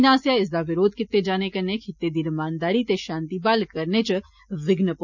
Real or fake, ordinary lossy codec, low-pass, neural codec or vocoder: real; none; 7.2 kHz; none